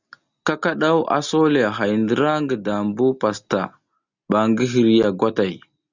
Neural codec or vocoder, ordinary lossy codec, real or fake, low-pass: none; Opus, 64 kbps; real; 7.2 kHz